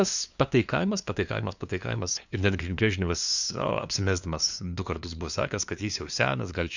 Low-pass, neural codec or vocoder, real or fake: 7.2 kHz; codec, 16 kHz, 2 kbps, FunCodec, trained on LibriTTS, 25 frames a second; fake